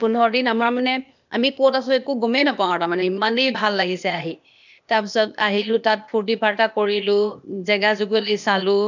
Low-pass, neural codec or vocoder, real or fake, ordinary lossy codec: 7.2 kHz; codec, 16 kHz, 0.8 kbps, ZipCodec; fake; none